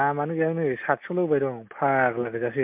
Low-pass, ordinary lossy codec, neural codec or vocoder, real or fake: 3.6 kHz; MP3, 32 kbps; none; real